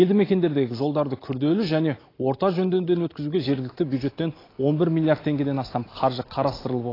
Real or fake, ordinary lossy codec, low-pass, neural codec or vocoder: real; AAC, 24 kbps; 5.4 kHz; none